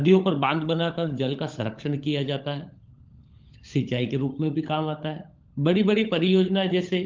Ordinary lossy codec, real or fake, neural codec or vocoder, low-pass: Opus, 32 kbps; fake; codec, 16 kHz, 16 kbps, FunCodec, trained on LibriTTS, 50 frames a second; 7.2 kHz